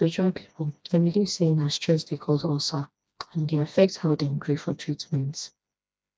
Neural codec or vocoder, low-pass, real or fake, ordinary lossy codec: codec, 16 kHz, 1 kbps, FreqCodec, smaller model; none; fake; none